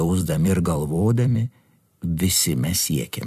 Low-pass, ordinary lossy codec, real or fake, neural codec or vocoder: 14.4 kHz; MP3, 96 kbps; fake; vocoder, 44.1 kHz, 128 mel bands every 256 samples, BigVGAN v2